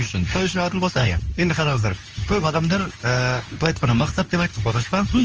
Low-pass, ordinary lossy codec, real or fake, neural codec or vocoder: 7.2 kHz; Opus, 24 kbps; fake; codec, 24 kHz, 0.9 kbps, WavTokenizer, medium speech release version 2